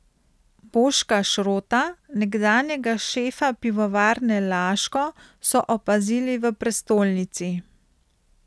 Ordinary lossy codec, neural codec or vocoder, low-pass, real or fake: none; none; none; real